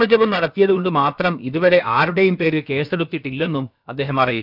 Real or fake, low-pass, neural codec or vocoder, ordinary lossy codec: fake; 5.4 kHz; codec, 16 kHz, about 1 kbps, DyCAST, with the encoder's durations; none